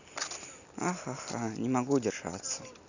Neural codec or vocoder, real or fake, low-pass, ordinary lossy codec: none; real; 7.2 kHz; none